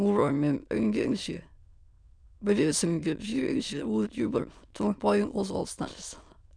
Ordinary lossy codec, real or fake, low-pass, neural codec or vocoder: none; fake; 9.9 kHz; autoencoder, 22.05 kHz, a latent of 192 numbers a frame, VITS, trained on many speakers